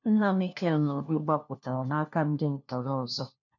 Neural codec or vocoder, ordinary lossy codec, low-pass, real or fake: codec, 16 kHz, 1 kbps, FunCodec, trained on LibriTTS, 50 frames a second; none; 7.2 kHz; fake